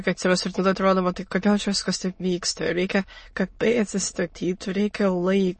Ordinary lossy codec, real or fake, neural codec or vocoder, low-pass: MP3, 32 kbps; fake; autoencoder, 22.05 kHz, a latent of 192 numbers a frame, VITS, trained on many speakers; 9.9 kHz